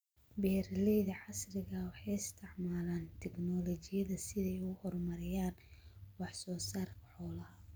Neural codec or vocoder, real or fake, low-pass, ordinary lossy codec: none; real; none; none